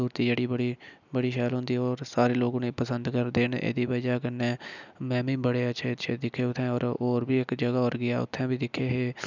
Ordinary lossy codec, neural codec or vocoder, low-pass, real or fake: none; none; 7.2 kHz; real